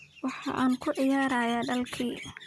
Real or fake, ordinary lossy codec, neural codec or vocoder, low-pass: real; none; none; none